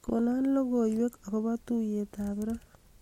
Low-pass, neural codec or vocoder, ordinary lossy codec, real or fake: 19.8 kHz; none; MP3, 64 kbps; real